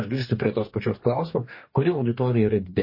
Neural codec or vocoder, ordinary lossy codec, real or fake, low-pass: codec, 44.1 kHz, 2.6 kbps, DAC; MP3, 24 kbps; fake; 5.4 kHz